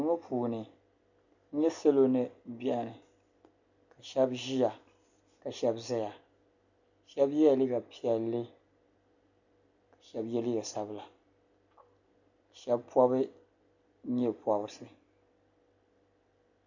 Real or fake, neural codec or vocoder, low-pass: real; none; 7.2 kHz